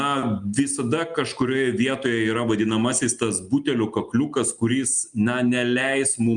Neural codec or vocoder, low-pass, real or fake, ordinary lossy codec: none; 9.9 kHz; real; Opus, 32 kbps